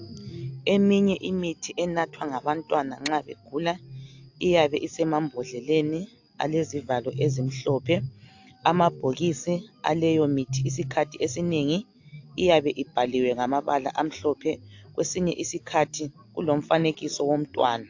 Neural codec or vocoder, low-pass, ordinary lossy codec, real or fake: none; 7.2 kHz; AAC, 48 kbps; real